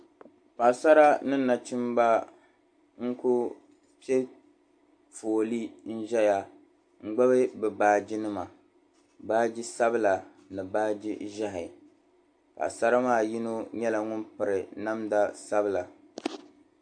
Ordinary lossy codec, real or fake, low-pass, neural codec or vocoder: AAC, 64 kbps; real; 9.9 kHz; none